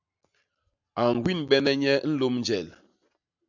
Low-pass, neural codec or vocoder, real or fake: 7.2 kHz; none; real